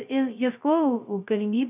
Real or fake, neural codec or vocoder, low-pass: fake; codec, 16 kHz, 0.2 kbps, FocalCodec; 3.6 kHz